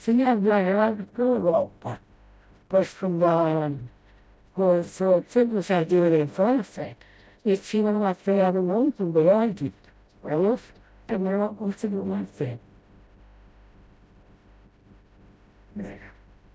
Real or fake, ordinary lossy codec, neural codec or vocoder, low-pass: fake; none; codec, 16 kHz, 0.5 kbps, FreqCodec, smaller model; none